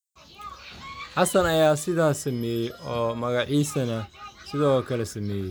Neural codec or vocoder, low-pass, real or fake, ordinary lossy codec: none; none; real; none